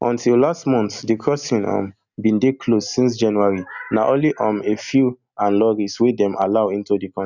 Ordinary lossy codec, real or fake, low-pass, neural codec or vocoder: none; real; 7.2 kHz; none